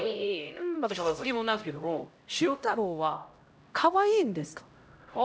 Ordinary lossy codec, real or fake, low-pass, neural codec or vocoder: none; fake; none; codec, 16 kHz, 0.5 kbps, X-Codec, HuBERT features, trained on LibriSpeech